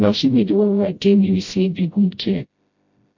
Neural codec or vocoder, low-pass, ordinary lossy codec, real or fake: codec, 16 kHz, 0.5 kbps, FreqCodec, smaller model; 7.2 kHz; MP3, 48 kbps; fake